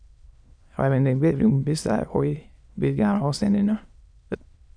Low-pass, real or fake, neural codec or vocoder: 9.9 kHz; fake; autoencoder, 22.05 kHz, a latent of 192 numbers a frame, VITS, trained on many speakers